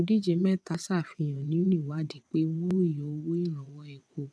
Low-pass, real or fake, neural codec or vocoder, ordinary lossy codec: none; fake; vocoder, 22.05 kHz, 80 mel bands, WaveNeXt; none